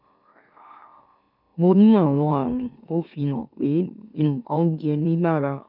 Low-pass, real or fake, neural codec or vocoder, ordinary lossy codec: 5.4 kHz; fake; autoencoder, 44.1 kHz, a latent of 192 numbers a frame, MeloTTS; none